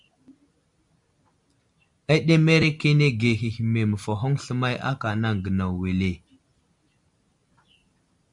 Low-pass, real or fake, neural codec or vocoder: 10.8 kHz; real; none